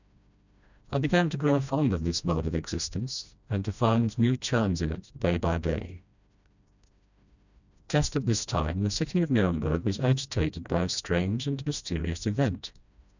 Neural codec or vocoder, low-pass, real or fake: codec, 16 kHz, 1 kbps, FreqCodec, smaller model; 7.2 kHz; fake